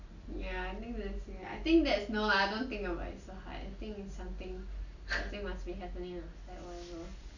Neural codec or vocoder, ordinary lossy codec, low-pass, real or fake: none; none; 7.2 kHz; real